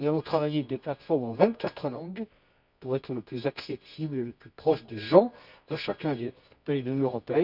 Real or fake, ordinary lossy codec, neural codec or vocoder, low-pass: fake; none; codec, 24 kHz, 0.9 kbps, WavTokenizer, medium music audio release; 5.4 kHz